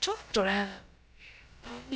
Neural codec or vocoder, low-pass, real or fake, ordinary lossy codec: codec, 16 kHz, about 1 kbps, DyCAST, with the encoder's durations; none; fake; none